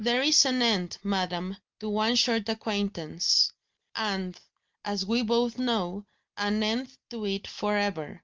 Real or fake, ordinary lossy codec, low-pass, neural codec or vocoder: real; Opus, 32 kbps; 7.2 kHz; none